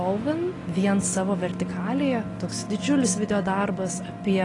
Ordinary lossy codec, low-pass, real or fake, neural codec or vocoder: AAC, 32 kbps; 10.8 kHz; real; none